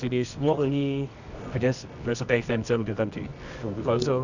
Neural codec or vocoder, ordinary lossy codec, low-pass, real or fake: codec, 24 kHz, 0.9 kbps, WavTokenizer, medium music audio release; none; 7.2 kHz; fake